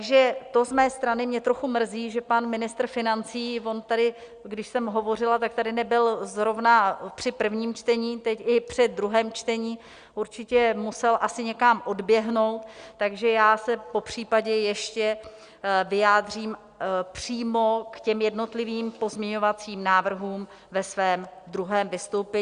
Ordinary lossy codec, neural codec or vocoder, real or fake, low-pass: Opus, 64 kbps; none; real; 9.9 kHz